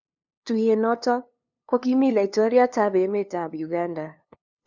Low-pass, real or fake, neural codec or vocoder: 7.2 kHz; fake; codec, 16 kHz, 2 kbps, FunCodec, trained on LibriTTS, 25 frames a second